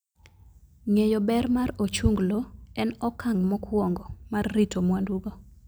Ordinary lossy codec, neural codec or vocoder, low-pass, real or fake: none; none; none; real